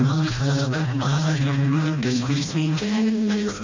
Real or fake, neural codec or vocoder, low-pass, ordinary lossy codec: fake; codec, 16 kHz, 1 kbps, FreqCodec, smaller model; 7.2 kHz; MP3, 48 kbps